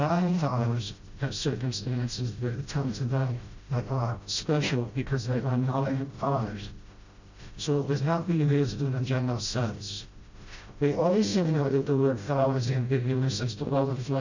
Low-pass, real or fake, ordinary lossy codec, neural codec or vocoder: 7.2 kHz; fake; Opus, 64 kbps; codec, 16 kHz, 0.5 kbps, FreqCodec, smaller model